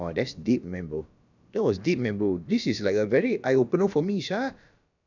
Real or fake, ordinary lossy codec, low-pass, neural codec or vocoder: fake; none; 7.2 kHz; codec, 16 kHz, about 1 kbps, DyCAST, with the encoder's durations